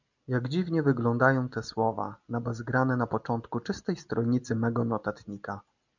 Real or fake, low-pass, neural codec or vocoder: real; 7.2 kHz; none